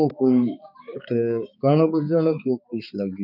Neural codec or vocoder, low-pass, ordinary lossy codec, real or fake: codec, 16 kHz, 4 kbps, X-Codec, HuBERT features, trained on balanced general audio; 5.4 kHz; none; fake